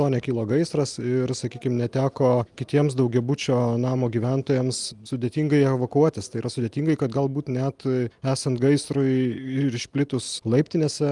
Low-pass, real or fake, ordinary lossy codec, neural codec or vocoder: 10.8 kHz; real; Opus, 32 kbps; none